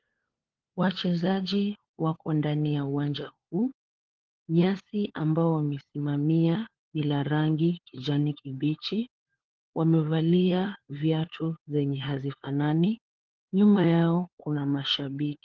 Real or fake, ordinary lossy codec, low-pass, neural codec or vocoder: fake; Opus, 16 kbps; 7.2 kHz; codec, 16 kHz, 4 kbps, FunCodec, trained on LibriTTS, 50 frames a second